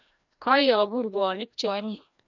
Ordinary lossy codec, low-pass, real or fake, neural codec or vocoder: none; 7.2 kHz; fake; codec, 16 kHz, 1 kbps, FreqCodec, larger model